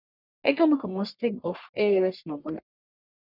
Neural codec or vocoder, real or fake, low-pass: codec, 44.1 kHz, 1.7 kbps, Pupu-Codec; fake; 5.4 kHz